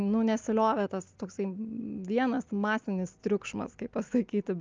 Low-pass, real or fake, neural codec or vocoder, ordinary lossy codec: 7.2 kHz; real; none; Opus, 24 kbps